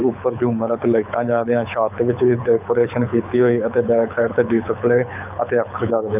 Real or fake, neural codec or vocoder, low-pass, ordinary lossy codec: fake; codec, 24 kHz, 6 kbps, HILCodec; 3.6 kHz; none